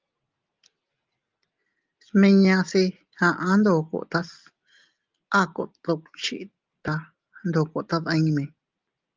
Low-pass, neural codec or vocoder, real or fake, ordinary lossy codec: 7.2 kHz; none; real; Opus, 32 kbps